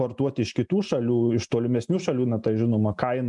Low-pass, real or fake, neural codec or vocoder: 10.8 kHz; real; none